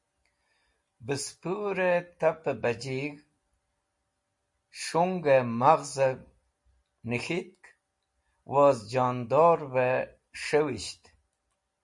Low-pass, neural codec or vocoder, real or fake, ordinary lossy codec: 10.8 kHz; none; real; MP3, 48 kbps